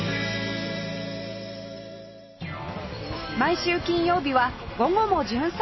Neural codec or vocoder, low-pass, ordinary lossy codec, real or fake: none; 7.2 kHz; MP3, 24 kbps; real